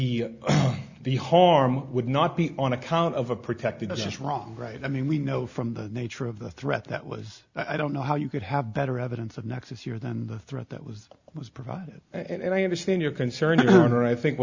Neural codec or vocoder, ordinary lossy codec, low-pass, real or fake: none; Opus, 64 kbps; 7.2 kHz; real